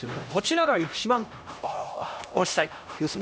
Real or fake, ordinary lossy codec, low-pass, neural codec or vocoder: fake; none; none; codec, 16 kHz, 1 kbps, X-Codec, HuBERT features, trained on LibriSpeech